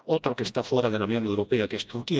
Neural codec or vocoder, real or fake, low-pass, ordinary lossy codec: codec, 16 kHz, 1 kbps, FreqCodec, smaller model; fake; none; none